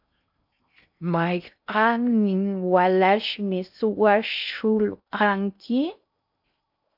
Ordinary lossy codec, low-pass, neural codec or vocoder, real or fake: AAC, 48 kbps; 5.4 kHz; codec, 16 kHz in and 24 kHz out, 0.6 kbps, FocalCodec, streaming, 2048 codes; fake